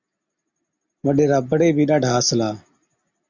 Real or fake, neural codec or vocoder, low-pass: real; none; 7.2 kHz